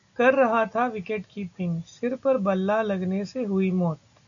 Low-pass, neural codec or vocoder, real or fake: 7.2 kHz; none; real